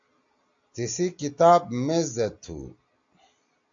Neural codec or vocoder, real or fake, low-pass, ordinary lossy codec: none; real; 7.2 kHz; AAC, 64 kbps